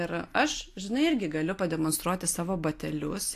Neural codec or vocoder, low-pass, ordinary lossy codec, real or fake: none; 14.4 kHz; AAC, 64 kbps; real